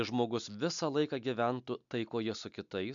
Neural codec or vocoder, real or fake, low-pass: none; real; 7.2 kHz